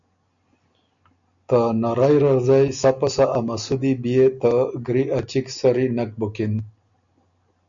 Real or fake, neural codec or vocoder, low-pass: real; none; 7.2 kHz